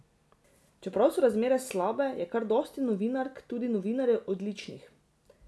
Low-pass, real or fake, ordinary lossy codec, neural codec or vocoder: none; real; none; none